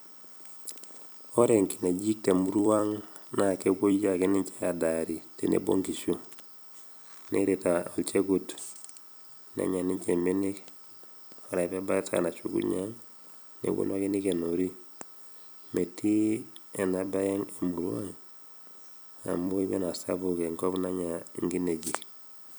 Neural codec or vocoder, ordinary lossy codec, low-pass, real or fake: vocoder, 44.1 kHz, 128 mel bands every 256 samples, BigVGAN v2; none; none; fake